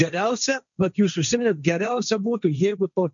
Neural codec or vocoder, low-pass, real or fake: codec, 16 kHz, 1.1 kbps, Voila-Tokenizer; 7.2 kHz; fake